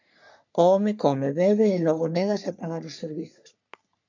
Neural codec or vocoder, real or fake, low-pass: codec, 44.1 kHz, 3.4 kbps, Pupu-Codec; fake; 7.2 kHz